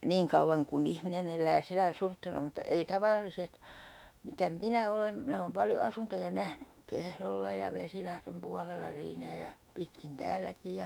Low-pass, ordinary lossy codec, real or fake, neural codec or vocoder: 19.8 kHz; none; fake; autoencoder, 48 kHz, 32 numbers a frame, DAC-VAE, trained on Japanese speech